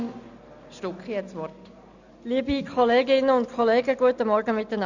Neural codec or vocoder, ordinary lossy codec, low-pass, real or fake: none; none; 7.2 kHz; real